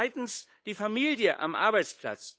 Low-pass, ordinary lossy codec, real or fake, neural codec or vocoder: none; none; fake; codec, 16 kHz, 8 kbps, FunCodec, trained on Chinese and English, 25 frames a second